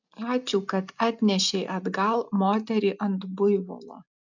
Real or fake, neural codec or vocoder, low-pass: real; none; 7.2 kHz